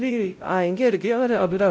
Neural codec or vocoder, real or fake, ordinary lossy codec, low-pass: codec, 16 kHz, 0.5 kbps, X-Codec, WavLM features, trained on Multilingual LibriSpeech; fake; none; none